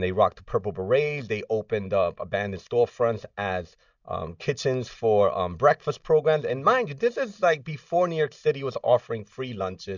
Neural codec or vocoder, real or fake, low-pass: none; real; 7.2 kHz